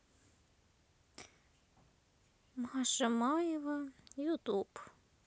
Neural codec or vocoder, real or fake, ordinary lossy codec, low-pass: none; real; none; none